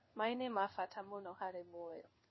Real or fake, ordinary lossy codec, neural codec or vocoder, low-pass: fake; MP3, 24 kbps; codec, 16 kHz in and 24 kHz out, 1 kbps, XY-Tokenizer; 7.2 kHz